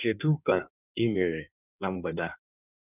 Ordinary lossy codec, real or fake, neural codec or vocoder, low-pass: none; fake; codec, 16 kHz in and 24 kHz out, 1.1 kbps, FireRedTTS-2 codec; 3.6 kHz